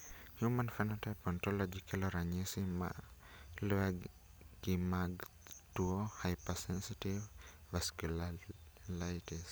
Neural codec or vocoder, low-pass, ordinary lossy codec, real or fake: none; none; none; real